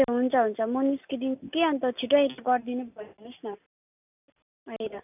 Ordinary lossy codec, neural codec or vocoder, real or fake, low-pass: none; none; real; 3.6 kHz